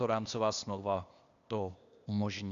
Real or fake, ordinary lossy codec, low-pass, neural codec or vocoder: fake; Opus, 64 kbps; 7.2 kHz; codec, 16 kHz, 0.8 kbps, ZipCodec